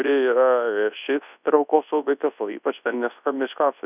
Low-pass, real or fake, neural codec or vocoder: 3.6 kHz; fake; codec, 24 kHz, 0.9 kbps, WavTokenizer, large speech release